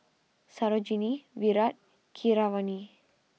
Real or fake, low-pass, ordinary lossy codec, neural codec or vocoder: real; none; none; none